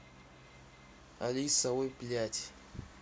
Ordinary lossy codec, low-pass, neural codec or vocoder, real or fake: none; none; none; real